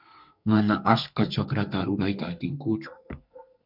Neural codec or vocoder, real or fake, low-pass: codec, 32 kHz, 1.9 kbps, SNAC; fake; 5.4 kHz